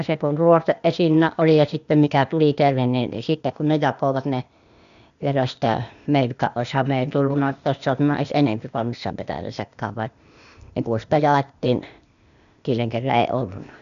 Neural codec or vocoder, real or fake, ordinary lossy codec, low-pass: codec, 16 kHz, 0.8 kbps, ZipCodec; fake; none; 7.2 kHz